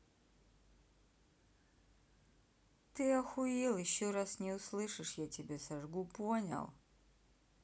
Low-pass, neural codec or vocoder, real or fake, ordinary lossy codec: none; none; real; none